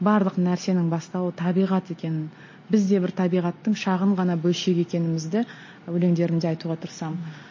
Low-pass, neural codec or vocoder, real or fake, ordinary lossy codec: 7.2 kHz; none; real; MP3, 32 kbps